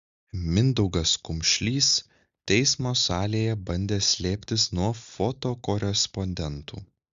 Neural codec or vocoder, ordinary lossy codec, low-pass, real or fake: none; Opus, 64 kbps; 7.2 kHz; real